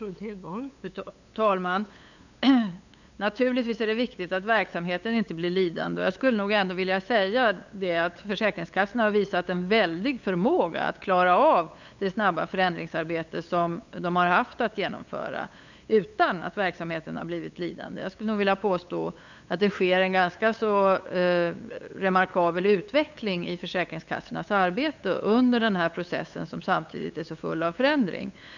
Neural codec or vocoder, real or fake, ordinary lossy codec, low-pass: codec, 16 kHz, 8 kbps, FunCodec, trained on LibriTTS, 25 frames a second; fake; none; 7.2 kHz